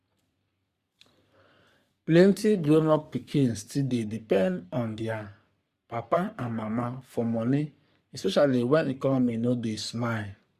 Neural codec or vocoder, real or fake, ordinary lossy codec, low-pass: codec, 44.1 kHz, 3.4 kbps, Pupu-Codec; fake; Opus, 64 kbps; 14.4 kHz